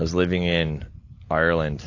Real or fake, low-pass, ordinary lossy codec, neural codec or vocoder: real; 7.2 kHz; AAC, 48 kbps; none